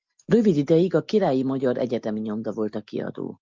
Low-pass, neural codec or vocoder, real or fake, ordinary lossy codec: 7.2 kHz; none; real; Opus, 24 kbps